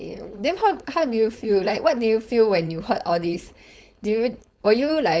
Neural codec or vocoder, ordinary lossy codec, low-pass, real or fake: codec, 16 kHz, 4.8 kbps, FACodec; none; none; fake